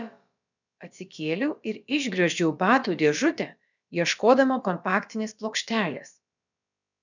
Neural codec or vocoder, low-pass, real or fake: codec, 16 kHz, about 1 kbps, DyCAST, with the encoder's durations; 7.2 kHz; fake